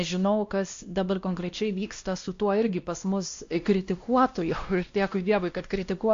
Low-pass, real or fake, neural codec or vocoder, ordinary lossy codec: 7.2 kHz; fake; codec, 16 kHz, 1 kbps, X-Codec, WavLM features, trained on Multilingual LibriSpeech; AAC, 48 kbps